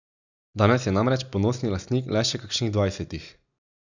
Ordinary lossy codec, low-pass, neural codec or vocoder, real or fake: none; 7.2 kHz; none; real